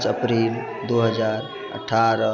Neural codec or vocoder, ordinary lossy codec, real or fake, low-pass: none; none; real; 7.2 kHz